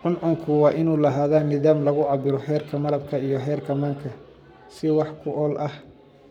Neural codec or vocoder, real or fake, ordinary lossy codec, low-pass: codec, 44.1 kHz, 7.8 kbps, Pupu-Codec; fake; none; 19.8 kHz